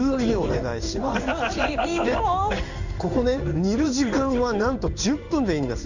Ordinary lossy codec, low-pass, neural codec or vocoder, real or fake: none; 7.2 kHz; codec, 16 kHz in and 24 kHz out, 1 kbps, XY-Tokenizer; fake